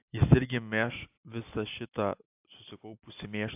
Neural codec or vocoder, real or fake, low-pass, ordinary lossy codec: none; real; 3.6 kHz; AAC, 32 kbps